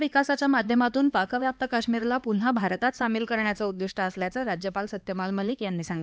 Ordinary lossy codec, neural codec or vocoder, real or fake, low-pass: none; codec, 16 kHz, 2 kbps, X-Codec, HuBERT features, trained on LibriSpeech; fake; none